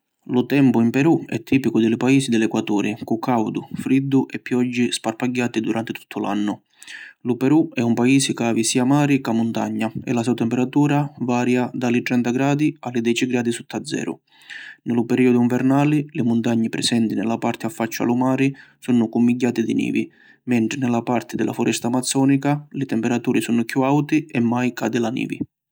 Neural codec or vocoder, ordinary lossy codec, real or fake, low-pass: none; none; real; none